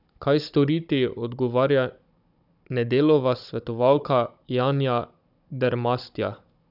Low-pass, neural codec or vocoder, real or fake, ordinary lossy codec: 5.4 kHz; codec, 16 kHz, 16 kbps, FunCodec, trained on Chinese and English, 50 frames a second; fake; none